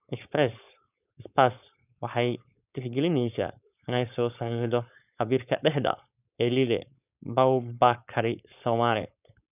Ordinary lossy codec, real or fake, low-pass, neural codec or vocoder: none; fake; 3.6 kHz; codec, 16 kHz, 4.8 kbps, FACodec